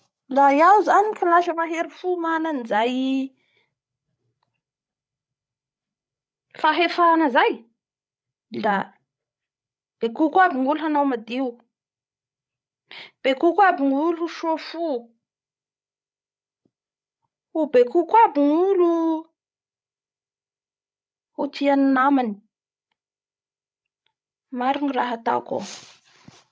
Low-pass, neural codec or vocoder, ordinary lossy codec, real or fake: none; codec, 16 kHz, 16 kbps, FreqCodec, larger model; none; fake